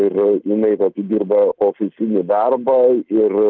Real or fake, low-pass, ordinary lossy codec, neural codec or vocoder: real; 7.2 kHz; Opus, 24 kbps; none